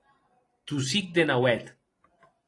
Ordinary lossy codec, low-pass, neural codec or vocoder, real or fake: AAC, 32 kbps; 10.8 kHz; none; real